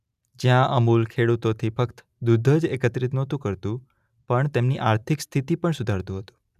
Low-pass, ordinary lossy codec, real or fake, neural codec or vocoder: 14.4 kHz; none; real; none